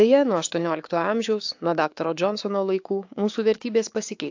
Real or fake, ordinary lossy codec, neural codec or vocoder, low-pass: fake; AAC, 48 kbps; codec, 16 kHz, 6 kbps, DAC; 7.2 kHz